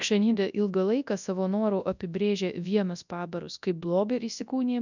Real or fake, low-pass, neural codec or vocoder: fake; 7.2 kHz; codec, 24 kHz, 0.9 kbps, WavTokenizer, large speech release